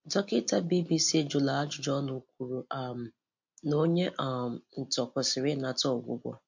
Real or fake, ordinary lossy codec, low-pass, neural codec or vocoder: real; MP3, 48 kbps; 7.2 kHz; none